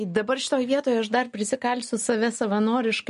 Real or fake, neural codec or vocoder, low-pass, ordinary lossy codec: real; none; 10.8 kHz; MP3, 48 kbps